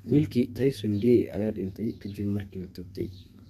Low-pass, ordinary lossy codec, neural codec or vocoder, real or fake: 14.4 kHz; none; codec, 32 kHz, 1.9 kbps, SNAC; fake